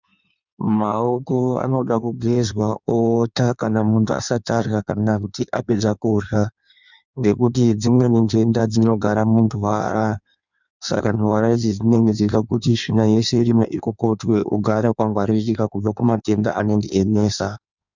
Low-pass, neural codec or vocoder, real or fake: 7.2 kHz; codec, 16 kHz in and 24 kHz out, 1.1 kbps, FireRedTTS-2 codec; fake